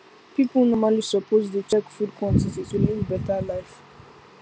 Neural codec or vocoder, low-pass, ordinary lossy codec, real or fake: none; none; none; real